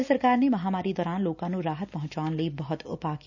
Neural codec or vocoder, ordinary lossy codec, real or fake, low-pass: none; none; real; 7.2 kHz